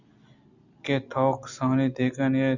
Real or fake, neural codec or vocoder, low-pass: real; none; 7.2 kHz